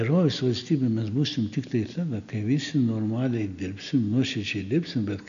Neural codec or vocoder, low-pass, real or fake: none; 7.2 kHz; real